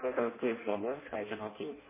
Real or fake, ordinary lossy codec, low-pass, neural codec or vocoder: fake; MP3, 16 kbps; 3.6 kHz; codec, 16 kHz in and 24 kHz out, 0.6 kbps, FireRedTTS-2 codec